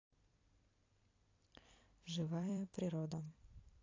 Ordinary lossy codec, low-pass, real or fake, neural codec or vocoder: AAC, 32 kbps; 7.2 kHz; fake; vocoder, 44.1 kHz, 128 mel bands every 256 samples, BigVGAN v2